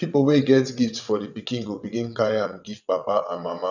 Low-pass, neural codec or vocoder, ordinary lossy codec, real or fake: 7.2 kHz; vocoder, 22.05 kHz, 80 mel bands, WaveNeXt; none; fake